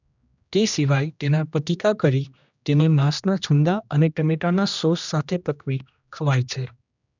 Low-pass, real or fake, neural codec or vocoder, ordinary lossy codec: 7.2 kHz; fake; codec, 16 kHz, 1 kbps, X-Codec, HuBERT features, trained on general audio; none